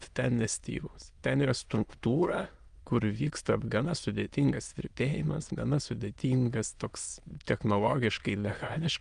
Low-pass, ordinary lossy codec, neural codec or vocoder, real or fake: 9.9 kHz; Opus, 32 kbps; autoencoder, 22.05 kHz, a latent of 192 numbers a frame, VITS, trained on many speakers; fake